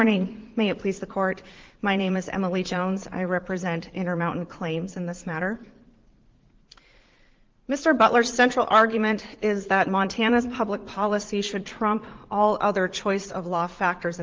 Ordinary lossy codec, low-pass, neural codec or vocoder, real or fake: Opus, 16 kbps; 7.2 kHz; vocoder, 44.1 kHz, 80 mel bands, Vocos; fake